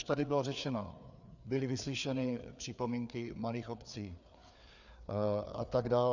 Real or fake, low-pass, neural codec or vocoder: fake; 7.2 kHz; codec, 16 kHz, 4 kbps, FreqCodec, larger model